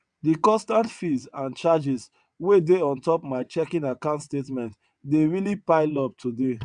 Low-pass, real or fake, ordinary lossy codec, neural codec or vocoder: 9.9 kHz; fake; none; vocoder, 22.05 kHz, 80 mel bands, WaveNeXt